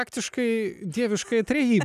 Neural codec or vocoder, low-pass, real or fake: none; 14.4 kHz; real